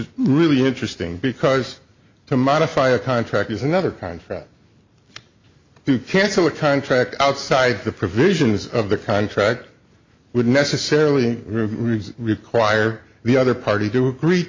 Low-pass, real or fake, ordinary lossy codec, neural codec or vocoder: 7.2 kHz; real; MP3, 48 kbps; none